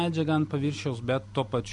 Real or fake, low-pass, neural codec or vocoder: real; 10.8 kHz; none